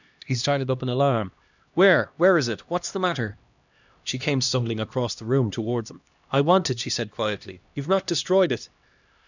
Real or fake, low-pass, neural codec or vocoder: fake; 7.2 kHz; codec, 16 kHz, 1 kbps, X-Codec, HuBERT features, trained on LibriSpeech